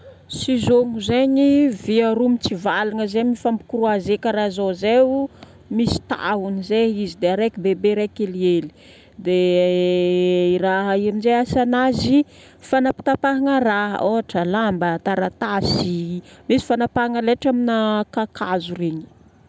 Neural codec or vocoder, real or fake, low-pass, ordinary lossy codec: none; real; none; none